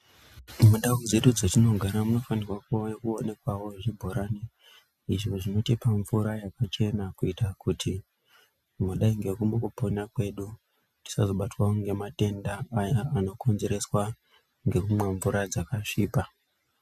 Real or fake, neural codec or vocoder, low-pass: real; none; 14.4 kHz